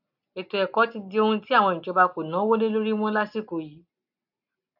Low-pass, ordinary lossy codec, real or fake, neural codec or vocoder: 5.4 kHz; AAC, 48 kbps; real; none